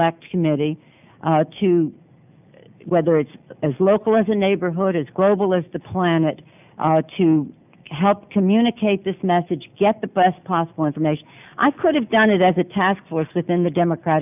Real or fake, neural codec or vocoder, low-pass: real; none; 3.6 kHz